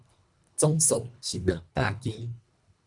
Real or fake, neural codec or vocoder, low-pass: fake; codec, 24 kHz, 1.5 kbps, HILCodec; 10.8 kHz